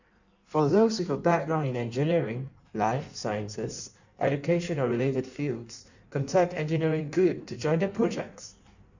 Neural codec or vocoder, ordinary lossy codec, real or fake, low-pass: codec, 16 kHz in and 24 kHz out, 1.1 kbps, FireRedTTS-2 codec; none; fake; 7.2 kHz